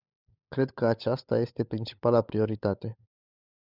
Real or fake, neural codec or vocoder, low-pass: fake; codec, 16 kHz, 16 kbps, FunCodec, trained on LibriTTS, 50 frames a second; 5.4 kHz